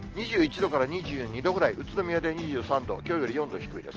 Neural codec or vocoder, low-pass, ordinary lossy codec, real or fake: none; 7.2 kHz; Opus, 16 kbps; real